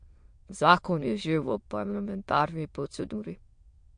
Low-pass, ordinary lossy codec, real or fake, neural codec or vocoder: 9.9 kHz; MP3, 48 kbps; fake; autoencoder, 22.05 kHz, a latent of 192 numbers a frame, VITS, trained on many speakers